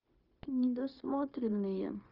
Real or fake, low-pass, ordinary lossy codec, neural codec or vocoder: fake; 5.4 kHz; Opus, 24 kbps; codec, 16 kHz, 0.9 kbps, LongCat-Audio-Codec